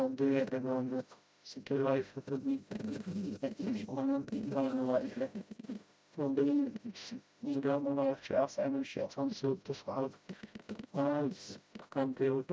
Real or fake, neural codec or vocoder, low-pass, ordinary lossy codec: fake; codec, 16 kHz, 0.5 kbps, FreqCodec, smaller model; none; none